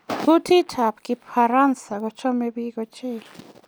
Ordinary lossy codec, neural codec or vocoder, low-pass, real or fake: none; none; none; real